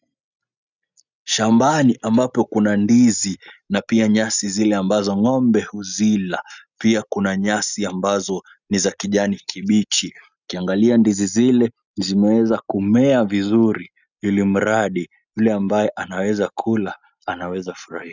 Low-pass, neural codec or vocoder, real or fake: 7.2 kHz; none; real